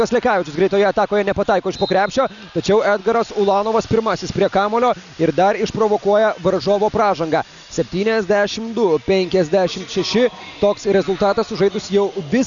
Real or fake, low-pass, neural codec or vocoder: real; 7.2 kHz; none